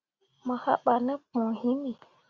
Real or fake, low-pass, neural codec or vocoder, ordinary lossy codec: real; 7.2 kHz; none; Opus, 64 kbps